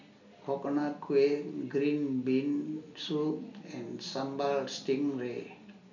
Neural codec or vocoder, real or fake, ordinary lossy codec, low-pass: none; real; none; 7.2 kHz